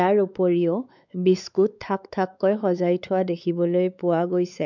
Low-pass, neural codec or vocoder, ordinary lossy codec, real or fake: 7.2 kHz; codec, 16 kHz, 4 kbps, X-Codec, WavLM features, trained on Multilingual LibriSpeech; none; fake